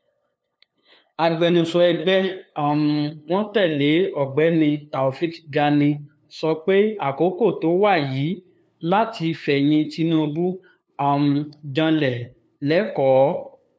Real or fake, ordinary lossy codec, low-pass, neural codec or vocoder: fake; none; none; codec, 16 kHz, 2 kbps, FunCodec, trained on LibriTTS, 25 frames a second